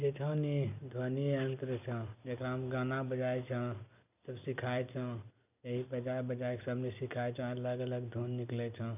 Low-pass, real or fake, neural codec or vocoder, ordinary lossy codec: 3.6 kHz; real; none; none